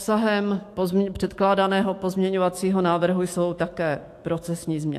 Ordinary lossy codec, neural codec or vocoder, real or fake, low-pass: AAC, 64 kbps; autoencoder, 48 kHz, 128 numbers a frame, DAC-VAE, trained on Japanese speech; fake; 14.4 kHz